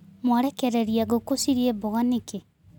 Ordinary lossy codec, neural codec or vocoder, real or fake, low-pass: none; none; real; 19.8 kHz